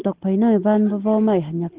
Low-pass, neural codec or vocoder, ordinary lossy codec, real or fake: 3.6 kHz; none; Opus, 16 kbps; real